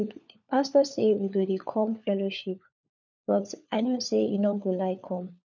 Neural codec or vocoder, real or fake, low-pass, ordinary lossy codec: codec, 16 kHz, 4 kbps, FunCodec, trained on LibriTTS, 50 frames a second; fake; 7.2 kHz; none